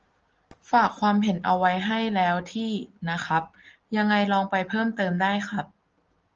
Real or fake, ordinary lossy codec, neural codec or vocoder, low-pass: real; Opus, 24 kbps; none; 7.2 kHz